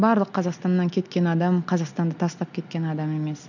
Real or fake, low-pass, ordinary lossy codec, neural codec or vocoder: real; 7.2 kHz; none; none